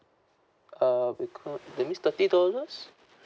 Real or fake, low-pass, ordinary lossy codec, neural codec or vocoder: real; none; none; none